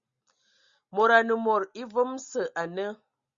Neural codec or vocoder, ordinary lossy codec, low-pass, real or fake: none; Opus, 64 kbps; 7.2 kHz; real